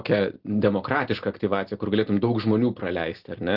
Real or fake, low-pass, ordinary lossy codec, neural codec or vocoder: real; 5.4 kHz; Opus, 16 kbps; none